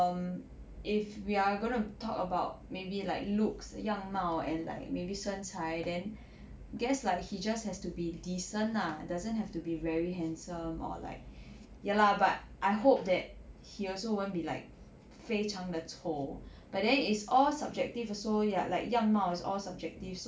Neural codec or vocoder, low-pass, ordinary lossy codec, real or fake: none; none; none; real